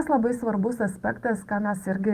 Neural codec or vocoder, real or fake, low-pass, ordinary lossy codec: vocoder, 44.1 kHz, 128 mel bands every 512 samples, BigVGAN v2; fake; 14.4 kHz; Opus, 32 kbps